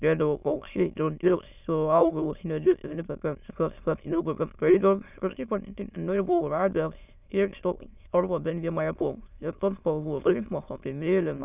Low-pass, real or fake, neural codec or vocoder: 3.6 kHz; fake; autoencoder, 22.05 kHz, a latent of 192 numbers a frame, VITS, trained on many speakers